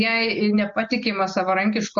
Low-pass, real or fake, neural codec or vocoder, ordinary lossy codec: 7.2 kHz; real; none; MP3, 48 kbps